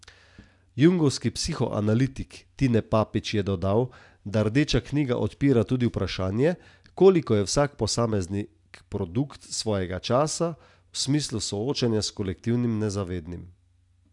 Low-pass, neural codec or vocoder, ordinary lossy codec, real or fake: 10.8 kHz; none; none; real